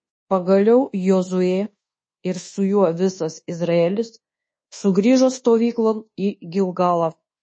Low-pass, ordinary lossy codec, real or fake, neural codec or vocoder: 9.9 kHz; MP3, 32 kbps; fake; codec, 24 kHz, 1.2 kbps, DualCodec